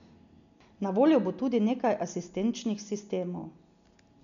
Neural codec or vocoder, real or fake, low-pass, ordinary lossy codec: none; real; 7.2 kHz; none